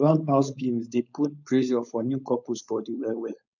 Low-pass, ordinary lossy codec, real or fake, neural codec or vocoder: 7.2 kHz; none; fake; codec, 16 kHz, 4.8 kbps, FACodec